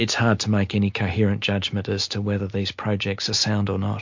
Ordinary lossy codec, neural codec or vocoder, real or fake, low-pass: MP3, 48 kbps; none; real; 7.2 kHz